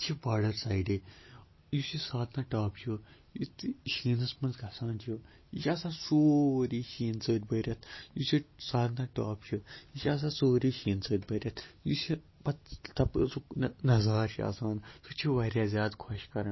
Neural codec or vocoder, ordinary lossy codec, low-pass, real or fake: none; MP3, 24 kbps; 7.2 kHz; real